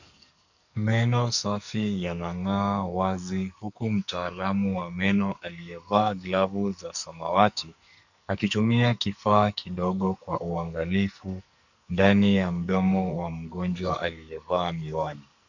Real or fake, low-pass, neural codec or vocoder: fake; 7.2 kHz; codec, 44.1 kHz, 2.6 kbps, SNAC